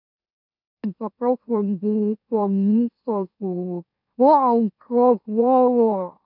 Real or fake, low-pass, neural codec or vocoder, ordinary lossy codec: fake; 5.4 kHz; autoencoder, 44.1 kHz, a latent of 192 numbers a frame, MeloTTS; none